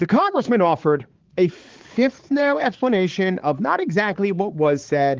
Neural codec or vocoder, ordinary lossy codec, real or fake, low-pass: codec, 16 kHz, 4 kbps, X-Codec, HuBERT features, trained on balanced general audio; Opus, 16 kbps; fake; 7.2 kHz